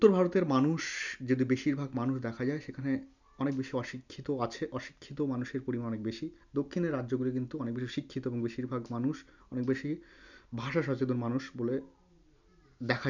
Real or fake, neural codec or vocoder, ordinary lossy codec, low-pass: real; none; none; 7.2 kHz